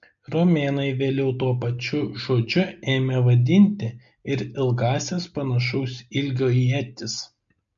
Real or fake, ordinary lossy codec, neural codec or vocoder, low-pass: real; MP3, 64 kbps; none; 7.2 kHz